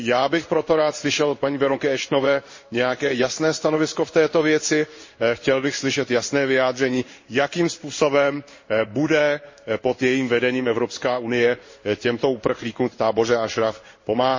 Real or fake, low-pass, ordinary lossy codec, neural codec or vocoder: fake; 7.2 kHz; MP3, 32 kbps; codec, 16 kHz in and 24 kHz out, 1 kbps, XY-Tokenizer